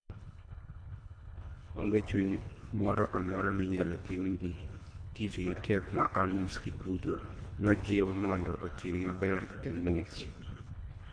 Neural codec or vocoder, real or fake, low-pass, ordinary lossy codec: codec, 24 kHz, 1.5 kbps, HILCodec; fake; 9.9 kHz; none